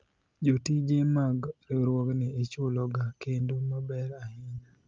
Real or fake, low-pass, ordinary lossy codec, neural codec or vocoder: real; 7.2 kHz; Opus, 24 kbps; none